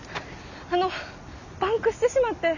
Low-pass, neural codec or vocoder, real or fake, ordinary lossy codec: 7.2 kHz; none; real; none